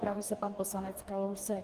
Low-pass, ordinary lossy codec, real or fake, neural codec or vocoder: 14.4 kHz; Opus, 24 kbps; fake; codec, 44.1 kHz, 2.6 kbps, DAC